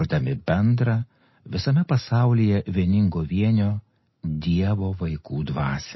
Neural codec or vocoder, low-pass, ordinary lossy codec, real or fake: none; 7.2 kHz; MP3, 24 kbps; real